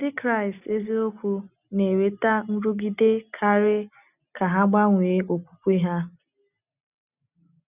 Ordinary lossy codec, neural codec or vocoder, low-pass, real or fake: none; none; 3.6 kHz; real